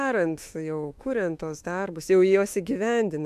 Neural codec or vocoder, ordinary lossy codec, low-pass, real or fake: autoencoder, 48 kHz, 32 numbers a frame, DAC-VAE, trained on Japanese speech; AAC, 96 kbps; 14.4 kHz; fake